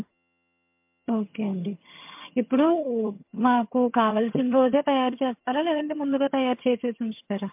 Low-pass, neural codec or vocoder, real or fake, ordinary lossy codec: 3.6 kHz; vocoder, 22.05 kHz, 80 mel bands, HiFi-GAN; fake; MP3, 32 kbps